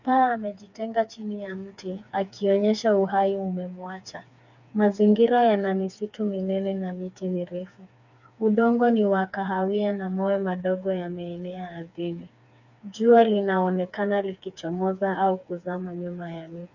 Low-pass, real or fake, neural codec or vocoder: 7.2 kHz; fake; codec, 16 kHz, 4 kbps, FreqCodec, smaller model